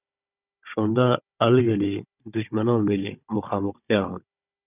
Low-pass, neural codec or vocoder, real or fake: 3.6 kHz; codec, 16 kHz, 16 kbps, FunCodec, trained on Chinese and English, 50 frames a second; fake